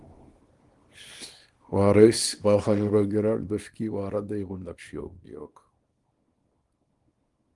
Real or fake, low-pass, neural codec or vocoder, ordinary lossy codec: fake; 10.8 kHz; codec, 24 kHz, 0.9 kbps, WavTokenizer, small release; Opus, 24 kbps